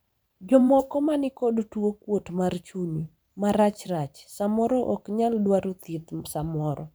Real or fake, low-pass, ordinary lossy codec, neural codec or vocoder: fake; none; none; vocoder, 44.1 kHz, 128 mel bands every 512 samples, BigVGAN v2